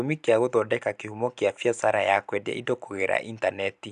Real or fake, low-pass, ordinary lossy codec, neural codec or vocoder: real; 10.8 kHz; none; none